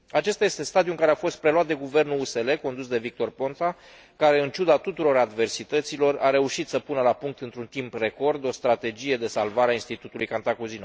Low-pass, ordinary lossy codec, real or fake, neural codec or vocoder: none; none; real; none